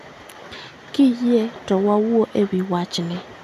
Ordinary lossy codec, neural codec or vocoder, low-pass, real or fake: none; none; 14.4 kHz; real